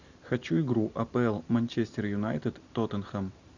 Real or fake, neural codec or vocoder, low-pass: real; none; 7.2 kHz